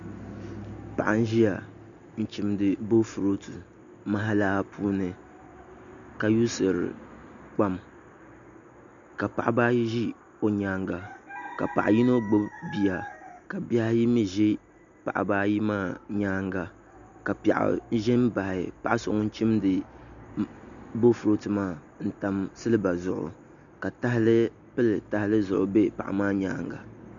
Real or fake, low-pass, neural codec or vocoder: real; 7.2 kHz; none